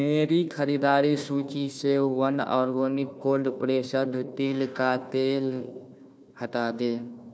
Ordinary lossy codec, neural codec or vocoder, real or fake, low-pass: none; codec, 16 kHz, 1 kbps, FunCodec, trained on Chinese and English, 50 frames a second; fake; none